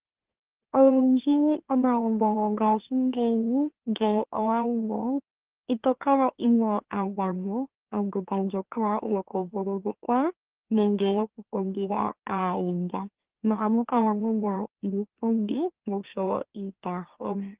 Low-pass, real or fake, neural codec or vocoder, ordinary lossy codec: 3.6 kHz; fake; autoencoder, 44.1 kHz, a latent of 192 numbers a frame, MeloTTS; Opus, 16 kbps